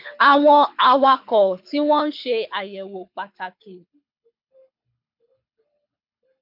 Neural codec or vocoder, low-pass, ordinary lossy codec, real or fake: codec, 24 kHz, 6 kbps, HILCodec; 5.4 kHz; MP3, 48 kbps; fake